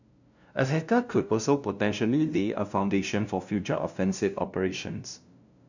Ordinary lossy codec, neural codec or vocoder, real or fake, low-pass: none; codec, 16 kHz, 0.5 kbps, FunCodec, trained on LibriTTS, 25 frames a second; fake; 7.2 kHz